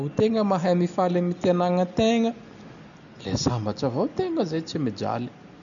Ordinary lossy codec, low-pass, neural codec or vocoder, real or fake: none; 7.2 kHz; none; real